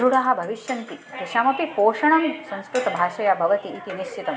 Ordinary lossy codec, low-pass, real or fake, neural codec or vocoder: none; none; real; none